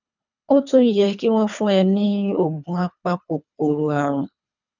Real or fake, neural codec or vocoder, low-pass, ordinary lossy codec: fake; codec, 24 kHz, 3 kbps, HILCodec; 7.2 kHz; none